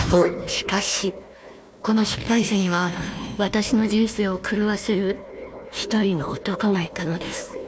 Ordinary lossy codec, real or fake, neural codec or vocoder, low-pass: none; fake; codec, 16 kHz, 1 kbps, FunCodec, trained on Chinese and English, 50 frames a second; none